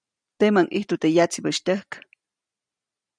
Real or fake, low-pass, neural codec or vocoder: real; 9.9 kHz; none